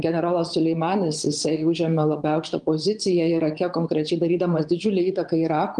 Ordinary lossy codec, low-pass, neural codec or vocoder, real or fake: Opus, 24 kbps; 9.9 kHz; vocoder, 22.05 kHz, 80 mel bands, Vocos; fake